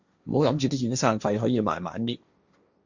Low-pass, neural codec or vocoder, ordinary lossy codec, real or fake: 7.2 kHz; codec, 16 kHz, 1.1 kbps, Voila-Tokenizer; Opus, 64 kbps; fake